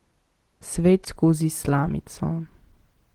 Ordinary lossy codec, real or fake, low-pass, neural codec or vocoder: Opus, 16 kbps; real; 19.8 kHz; none